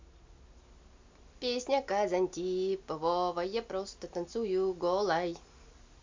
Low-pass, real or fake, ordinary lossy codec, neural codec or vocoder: 7.2 kHz; real; MP3, 64 kbps; none